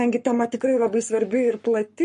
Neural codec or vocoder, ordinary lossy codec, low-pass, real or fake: codec, 44.1 kHz, 7.8 kbps, Pupu-Codec; MP3, 48 kbps; 14.4 kHz; fake